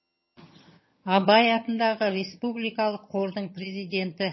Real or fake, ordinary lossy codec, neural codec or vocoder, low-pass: fake; MP3, 24 kbps; vocoder, 22.05 kHz, 80 mel bands, HiFi-GAN; 7.2 kHz